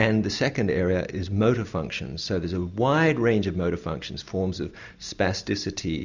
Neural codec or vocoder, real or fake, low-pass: none; real; 7.2 kHz